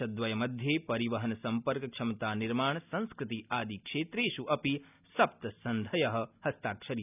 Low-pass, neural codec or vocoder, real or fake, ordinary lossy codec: 3.6 kHz; none; real; none